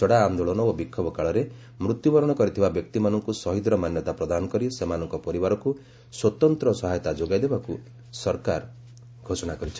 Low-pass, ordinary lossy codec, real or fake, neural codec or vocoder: none; none; real; none